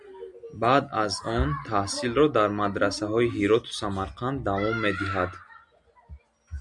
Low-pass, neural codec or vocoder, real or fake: 10.8 kHz; none; real